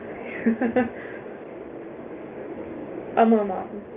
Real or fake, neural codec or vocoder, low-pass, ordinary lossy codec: fake; codec, 16 kHz, 6 kbps, DAC; 3.6 kHz; Opus, 32 kbps